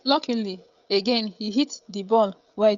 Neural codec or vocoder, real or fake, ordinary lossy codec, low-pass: codec, 16 kHz, 16 kbps, FreqCodec, smaller model; fake; Opus, 64 kbps; 7.2 kHz